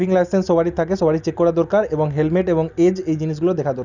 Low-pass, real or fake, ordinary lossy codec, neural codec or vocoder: 7.2 kHz; real; none; none